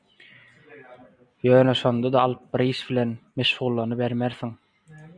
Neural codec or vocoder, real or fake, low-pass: none; real; 9.9 kHz